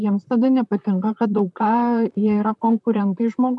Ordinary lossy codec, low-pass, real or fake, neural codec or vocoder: MP3, 96 kbps; 10.8 kHz; fake; vocoder, 44.1 kHz, 128 mel bands, Pupu-Vocoder